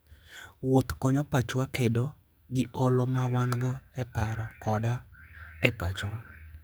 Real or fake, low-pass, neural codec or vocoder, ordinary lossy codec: fake; none; codec, 44.1 kHz, 2.6 kbps, SNAC; none